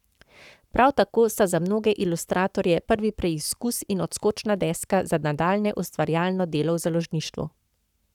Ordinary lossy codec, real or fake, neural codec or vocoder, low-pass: none; fake; codec, 44.1 kHz, 7.8 kbps, Pupu-Codec; 19.8 kHz